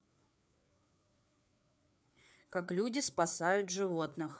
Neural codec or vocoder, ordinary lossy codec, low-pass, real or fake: codec, 16 kHz, 4 kbps, FreqCodec, larger model; none; none; fake